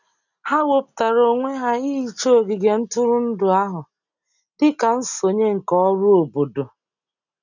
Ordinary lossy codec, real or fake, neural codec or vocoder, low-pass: none; real; none; 7.2 kHz